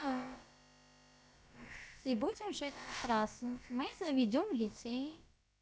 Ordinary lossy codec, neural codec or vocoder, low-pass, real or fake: none; codec, 16 kHz, about 1 kbps, DyCAST, with the encoder's durations; none; fake